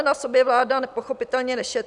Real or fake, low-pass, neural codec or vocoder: real; 10.8 kHz; none